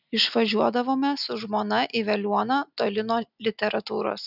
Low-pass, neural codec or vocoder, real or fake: 5.4 kHz; none; real